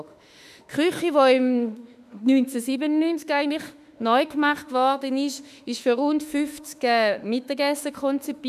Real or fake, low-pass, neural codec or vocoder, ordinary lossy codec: fake; 14.4 kHz; autoencoder, 48 kHz, 32 numbers a frame, DAC-VAE, trained on Japanese speech; none